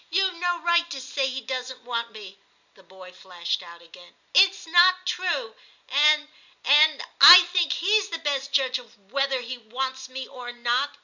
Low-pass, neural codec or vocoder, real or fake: 7.2 kHz; none; real